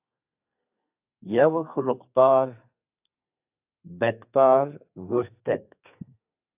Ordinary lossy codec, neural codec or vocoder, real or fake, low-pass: AAC, 32 kbps; codec, 32 kHz, 1.9 kbps, SNAC; fake; 3.6 kHz